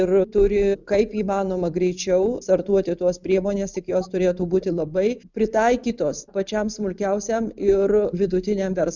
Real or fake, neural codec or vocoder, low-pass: real; none; 7.2 kHz